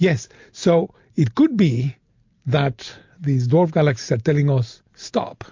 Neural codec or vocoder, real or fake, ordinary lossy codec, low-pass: none; real; MP3, 48 kbps; 7.2 kHz